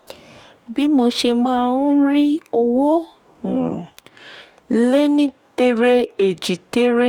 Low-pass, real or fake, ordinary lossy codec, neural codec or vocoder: 19.8 kHz; fake; none; codec, 44.1 kHz, 2.6 kbps, DAC